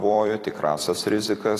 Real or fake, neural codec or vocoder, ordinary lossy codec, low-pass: fake; vocoder, 44.1 kHz, 128 mel bands every 256 samples, BigVGAN v2; AAC, 48 kbps; 14.4 kHz